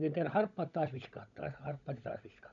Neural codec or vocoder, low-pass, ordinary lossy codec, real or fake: codec, 16 kHz, 16 kbps, FunCodec, trained on LibriTTS, 50 frames a second; 7.2 kHz; none; fake